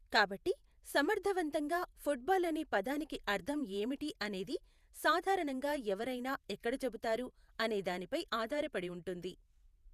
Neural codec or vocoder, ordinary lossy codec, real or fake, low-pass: vocoder, 48 kHz, 128 mel bands, Vocos; none; fake; 14.4 kHz